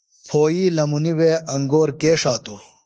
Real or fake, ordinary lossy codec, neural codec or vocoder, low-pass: fake; Opus, 24 kbps; autoencoder, 48 kHz, 32 numbers a frame, DAC-VAE, trained on Japanese speech; 9.9 kHz